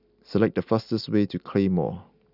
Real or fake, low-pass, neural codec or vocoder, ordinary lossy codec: real; 5.4 kHz; none; none